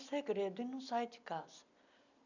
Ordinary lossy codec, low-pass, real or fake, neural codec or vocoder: none; 7.2 kHz; real; none